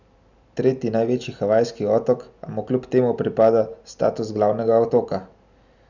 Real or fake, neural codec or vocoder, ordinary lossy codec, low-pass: real; none; none; 7.2 kHz